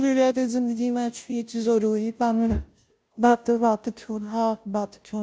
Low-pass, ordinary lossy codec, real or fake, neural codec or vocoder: none; none; fake; codec, 16 kHz, 0.5 kbps, FunCodec, trained on Chinese and English, 25 frames a second